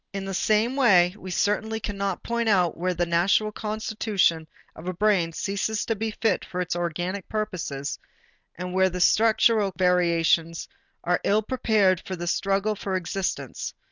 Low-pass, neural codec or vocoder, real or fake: 7.2 kHz; none; real